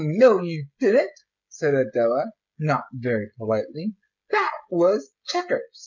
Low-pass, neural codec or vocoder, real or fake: 7.2 kHz; codec, 16 kHz, 16 kbps, FreqCodec, smaller model; fake